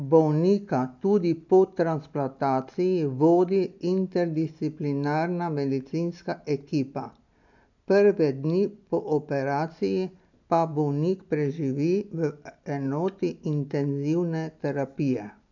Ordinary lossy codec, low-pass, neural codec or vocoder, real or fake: none; 7.2 kHz; none; real